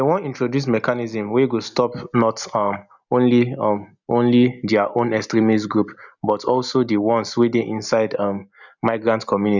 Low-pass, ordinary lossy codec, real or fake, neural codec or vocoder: 7.2 kHz; none; real; none